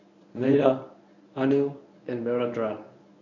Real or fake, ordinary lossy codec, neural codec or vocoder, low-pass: fake; none; codec, 24 kHz, 0.9 kbps, WavTokenizer, medium speech release version 1; 7.2 kHz